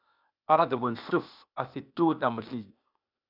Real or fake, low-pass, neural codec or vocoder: fake; 5.4 kHz; codec, 16 kHz, 0.8 kbps, ZipCodec